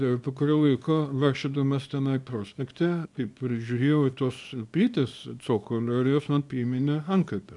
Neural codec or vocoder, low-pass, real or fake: codec, 24 kHz, 0.9 kbps, WavTokenizer, small release; 10.8 kHz; fake